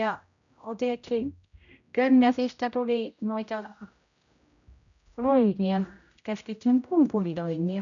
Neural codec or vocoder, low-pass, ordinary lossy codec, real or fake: codec, 16 kHz, 0.5 kbps, X-Codec, HuBERT features, trained on general audio; 7.2 kHz; none; fake